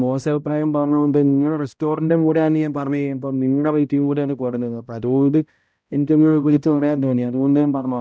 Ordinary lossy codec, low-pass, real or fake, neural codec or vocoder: none; none; fake; codec, 16 kHz, 0.5 kbps, X-Codec, HuBERT features, trained on balanced general audio